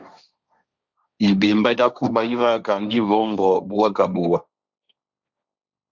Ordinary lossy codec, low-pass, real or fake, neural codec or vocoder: Opus, 64 kbps; 7.2 kHz; fake; codec, 16 kHz, 1.1 kbps, Voila-Tokenizer